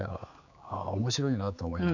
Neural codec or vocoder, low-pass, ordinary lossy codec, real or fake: codec, 16 kHz, 4 kbps, X-Codec, HuBERT features, trained on general audio; 7.2 kHz; none; fake